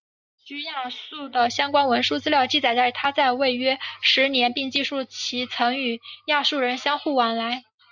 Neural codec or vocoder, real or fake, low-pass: none; real; 7.2 kHz